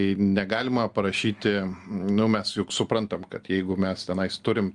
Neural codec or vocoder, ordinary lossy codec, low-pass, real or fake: none; Opus, 32 kbps; 10.8 kHz; real